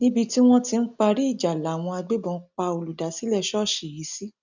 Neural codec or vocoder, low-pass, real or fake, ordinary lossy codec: none; 7.2 kHz; real; none